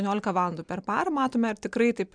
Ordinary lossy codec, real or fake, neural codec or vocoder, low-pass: MP3, 96 kbps; real; none; 9.9 kHz